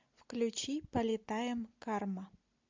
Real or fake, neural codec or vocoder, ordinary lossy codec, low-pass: real; none; MP3, 64 kbps; 7.2 kHz